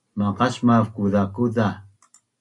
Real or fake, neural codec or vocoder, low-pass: real; none; 10.8 kHz